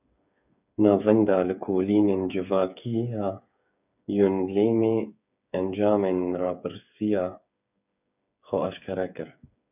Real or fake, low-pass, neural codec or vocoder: fake; 3.6 kHz; codec, 16 kHz, 8 kbps, FreqCodec, smaller model